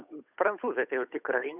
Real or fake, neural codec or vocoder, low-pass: fake; codec, 16 kHz, 8 kbps, FunCodec, trained on Chinese and English, 25 frames a second; 3.6 kHz